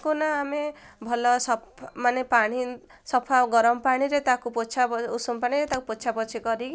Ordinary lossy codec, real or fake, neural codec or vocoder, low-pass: none; real; none; none